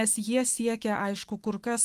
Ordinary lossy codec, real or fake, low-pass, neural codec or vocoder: Opus, 24 kbps; fake; 14.4 kHz; autoencoder, 48 kHz, 128 numbers a frame, DAC-VAE, trained on Japanese speech